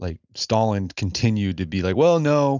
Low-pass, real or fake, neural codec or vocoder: 7.2 kHz; real; none